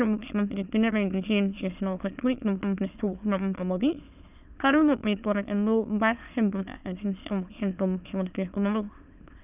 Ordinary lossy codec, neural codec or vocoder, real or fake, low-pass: none; autoencoder, 22.05 kHz, a latent of 192 numbers a frame, VITS, trained on many speakers; fake; 3.6 kHz